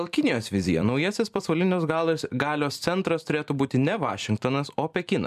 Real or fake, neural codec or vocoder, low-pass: fake; vocoder, 44.1 kHz, 128 mel bands every 256 samples, BigVGAN v2; 14.4 kHz